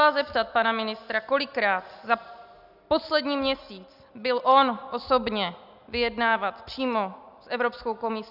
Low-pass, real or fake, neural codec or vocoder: 5.4 kHz; real; none